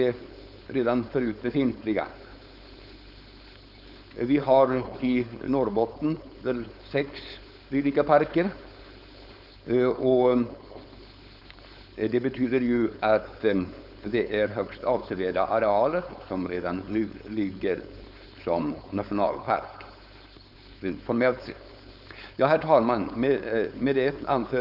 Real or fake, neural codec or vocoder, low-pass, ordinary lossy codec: fake; codec, 16 kHz, 4.8 kbps, FACodec; 5.4 kHz; none